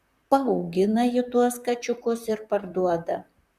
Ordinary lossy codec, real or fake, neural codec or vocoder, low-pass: Opus, 64 kbps; fake; vocoder, 44.1 kHz, 128 mel bands, Pupu-Vocoder; 14.4 kHz